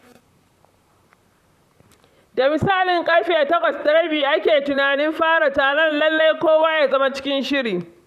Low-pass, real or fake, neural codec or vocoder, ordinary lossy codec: 14.4 kHz; fake; vocoder, 44.1 kHz, 128 mel bands, Pupu-Vocoder; none